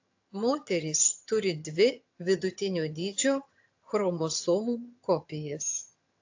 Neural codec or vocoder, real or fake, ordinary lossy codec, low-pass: vocoder, 22.05 kHz, 80 mel bands, HiFi-GAN; fake; AAC, 48 kbps; 7.2 kHz